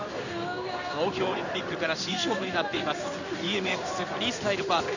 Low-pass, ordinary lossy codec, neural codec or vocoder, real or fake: 7.2 kHz; none; codec, 16 kHz in and 24 kHz out, 1 kbps, XY-Tokenizer; fake